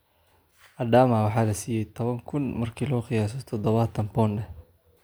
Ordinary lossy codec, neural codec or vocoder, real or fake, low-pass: none; none; real; none